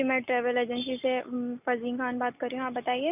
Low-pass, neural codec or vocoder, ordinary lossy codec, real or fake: 3.6 kHz; none; none; real